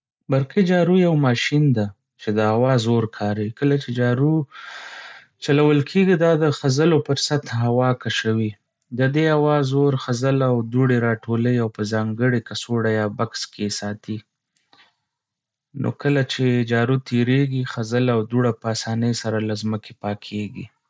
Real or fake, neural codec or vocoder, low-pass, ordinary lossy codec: real; none; none; none